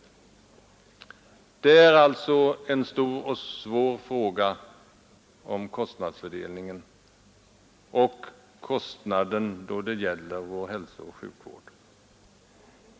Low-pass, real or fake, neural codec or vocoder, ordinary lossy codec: none; real; none; none